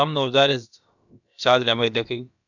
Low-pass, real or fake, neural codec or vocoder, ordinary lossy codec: 7.2 kHz; fake; codec, 16 kHz, about 1 kbps, DyCAST, with the encoder's durations; none